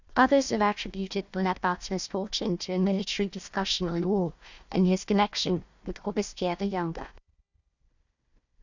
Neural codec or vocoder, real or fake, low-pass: codec, 16 kHz, 1 kbps, FunCodec, trained on Chinese and English, 50 frames a second; fake; 7.2 kHz